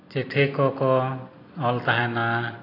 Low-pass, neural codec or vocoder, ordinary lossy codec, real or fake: 5.4 kHz; none; AAC, 24 kbps; real